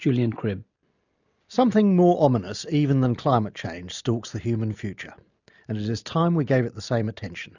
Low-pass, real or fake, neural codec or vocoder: 7.2 kHz; real; none